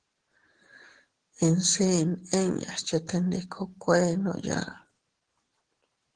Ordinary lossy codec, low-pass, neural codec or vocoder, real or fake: Opus, 16 kbps; 9.9 kHz; none; real